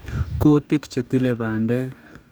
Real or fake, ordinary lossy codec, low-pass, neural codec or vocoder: fake; none; none; codec, 44.1 kHz, 2.6 kbps, DAC